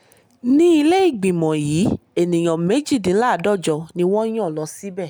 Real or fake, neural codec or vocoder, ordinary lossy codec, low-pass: real; none; none; none